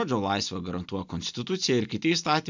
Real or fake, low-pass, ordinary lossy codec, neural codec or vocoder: real; 7.2 kHz; MP3, 64 kbps; none